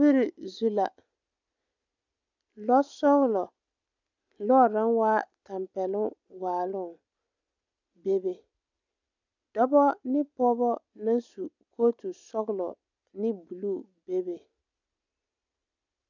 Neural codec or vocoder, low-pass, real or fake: none; 7.2 kHz; real